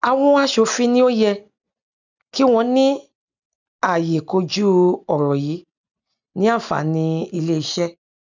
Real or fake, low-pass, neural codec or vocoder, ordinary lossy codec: real; 7.2 kHz; none; none